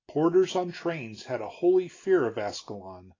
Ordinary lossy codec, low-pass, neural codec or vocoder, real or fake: AAC, 32 kbps; 7.2 kHz; none; real